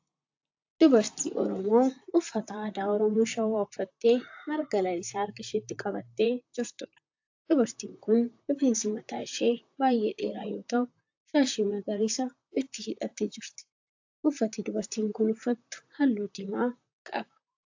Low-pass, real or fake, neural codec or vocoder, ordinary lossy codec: 7.2 kHz; fake; vocoder, 44.1 kHz, 128 mel bands, Pupu-Vocoder; AAC, 48 kbps